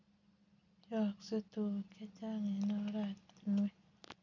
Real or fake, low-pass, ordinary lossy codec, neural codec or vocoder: real; 7.2 kHz; none; none